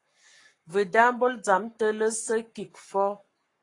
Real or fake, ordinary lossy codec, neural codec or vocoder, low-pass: fake; AAC, 32 kbps; codec, 44.1 kHz, 7.8 kbps, Pupu-Codec; 10.8 kHz